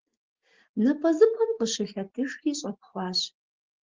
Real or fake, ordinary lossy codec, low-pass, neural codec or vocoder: real; Opus, 16 kbps; 7.2 kHz; none